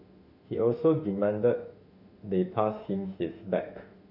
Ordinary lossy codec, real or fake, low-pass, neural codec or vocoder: none; fake; 5.4 kHz; autoencoder, 48 kHz, 32 numbers a frame, DAC-VAE, trained on Japanese speech